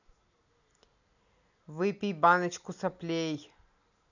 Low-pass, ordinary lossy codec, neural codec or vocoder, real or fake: 7.2 kHz; none; none; real